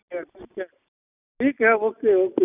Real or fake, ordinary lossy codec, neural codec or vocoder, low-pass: real; none; none; 3.6 kHz